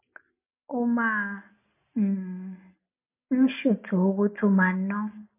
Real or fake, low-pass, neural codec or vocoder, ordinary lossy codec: real; 3.6 kHz; none; MP3, 24 kbps